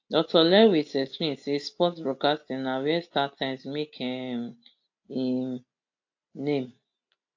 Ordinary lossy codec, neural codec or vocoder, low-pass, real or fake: AAC, 48 kbps; none; 7.2 kHz; real